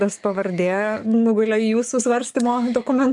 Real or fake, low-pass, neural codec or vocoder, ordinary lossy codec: fake; 10.8 kHz; codec, 44.1 kHz, 7.8 kbps, DAC; MP3, 96 kbps